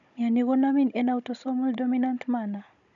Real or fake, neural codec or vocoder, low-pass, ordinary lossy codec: fake; codec, 16 kHz, 16 kbps, FunCodec, trained on Chinese and English, 50 frames a second; 7.2 kHz; none